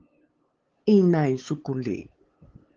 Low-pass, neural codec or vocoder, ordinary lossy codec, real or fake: 7.2 kHz; codec, 16 kHz, 8 kbps, FunCodec, trained on LibriTTS, 25 frames a second; Opus, 16 kbps; fake